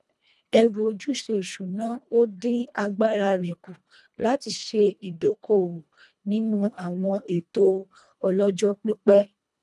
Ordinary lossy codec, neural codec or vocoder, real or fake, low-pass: none; codec, 24 kHz, 1.5 kbps, HILCodec; fake; none